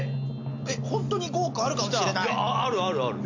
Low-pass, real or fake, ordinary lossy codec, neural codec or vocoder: 7.2 kHz; real; AAC, 48 kbps; none